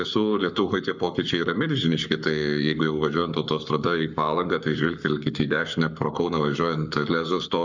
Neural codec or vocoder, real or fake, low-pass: codec, 44.1 kHz, 7.8 kbps, Pupu-Codec; fake; 7.2 kHz